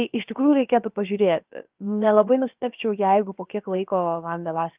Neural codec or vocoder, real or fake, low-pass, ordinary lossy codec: codec, 16 kHz, about 1 kbps, DyCAST, with the encoder's durations; fake; 3.6 kHz; Opus, 24 kbps